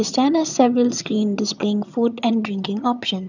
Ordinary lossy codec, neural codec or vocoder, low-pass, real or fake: none; vocoder, 22.05 kHz, 80 mel bands, HiFi-GAN; 7.2 kHz; fake